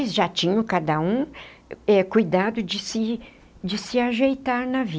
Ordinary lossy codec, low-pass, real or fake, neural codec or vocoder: none; none; real; none